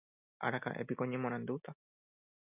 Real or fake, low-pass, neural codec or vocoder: real; 3.6 kHz; none